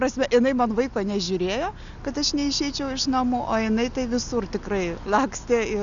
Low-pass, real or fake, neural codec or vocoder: 7.2 kHz; real; none